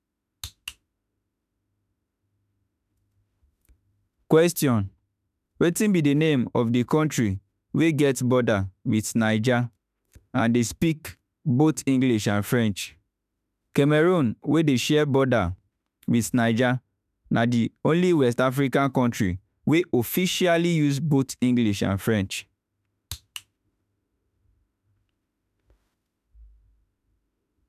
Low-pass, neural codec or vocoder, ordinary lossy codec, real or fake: 14.4 kHz; autoencoder, 48 kHz, 32 numbers a frame, DAC-VAE, trained on Japanese speech; none; fake